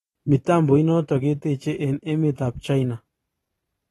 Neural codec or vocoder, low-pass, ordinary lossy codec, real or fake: vocoder, 44.1 kHz, 128 mel bands every 512 samples, BigVGAN v2; 19.8 kHz; AAC, 32 kbps; fake